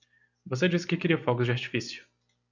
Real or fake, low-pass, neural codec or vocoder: real; 7.2 kHz; none